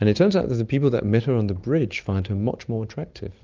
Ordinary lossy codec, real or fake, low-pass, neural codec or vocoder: Opus, 24 kbps; real; 7.2 kHz; none